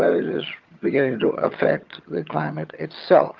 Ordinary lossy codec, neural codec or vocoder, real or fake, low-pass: Opus, 32 kbps; vocoder, 22.05 kHz, 80 mel bands, HiFi-GAN; fake; 7.2 kHz